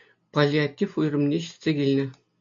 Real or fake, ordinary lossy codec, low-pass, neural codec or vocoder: real; AAC, 64 kbps; 7.2 kHz; none